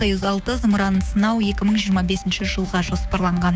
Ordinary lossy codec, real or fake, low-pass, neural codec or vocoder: none; fake; none; codec, 16 kHz, 6 kbps, DAC